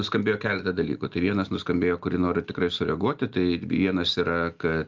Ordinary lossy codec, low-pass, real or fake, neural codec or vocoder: Opus, 32 kbps; 7.2 kHz; real; none